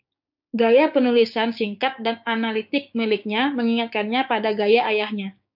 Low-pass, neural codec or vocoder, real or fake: 5.4 kHz; codec, 16 kHz, 6 kbps, DAC; fake